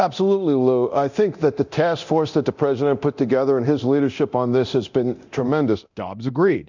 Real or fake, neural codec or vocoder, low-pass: fake; codec, 24 kHz, 0.9 kbps, DualCodec; 7.2 kHz